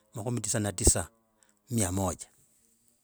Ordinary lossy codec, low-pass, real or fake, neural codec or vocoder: none; none; real; none